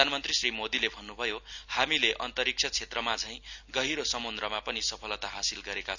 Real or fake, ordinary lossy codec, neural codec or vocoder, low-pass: real; none; none; 7.2 kHz